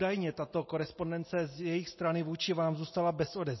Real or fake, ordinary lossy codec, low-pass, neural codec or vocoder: real; MP3, 24 kbps; 7.2 kHz; none